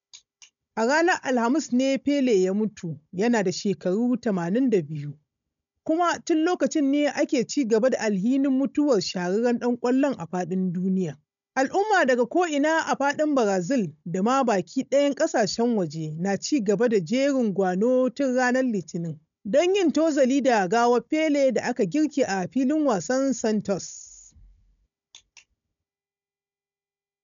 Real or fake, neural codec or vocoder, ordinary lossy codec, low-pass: fake; codec, 16 kHz, 16 kbps, FunCodec, trained on Chinese and English, 50 frames a second; MP3, 96 kbps; 7.2 kHz